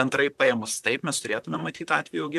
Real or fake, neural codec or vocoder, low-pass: fake; vocoder, 44.1 kHz, 128 mel bands, Pupu-Vocoder; 14.4 kHz